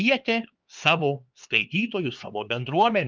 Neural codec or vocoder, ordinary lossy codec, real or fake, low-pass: codec, 16 kHz, 4 kbps, X-Codec, HuBERT features, trained on LibriSpeech; Opus, 24 kbps; fake; 7.2 kHz